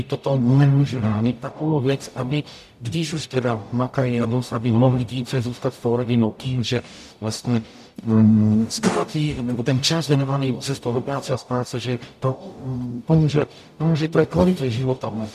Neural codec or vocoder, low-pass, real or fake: codec, 44.1 kHz, 0.9 kbps, DAC; 14.4 kHz; fake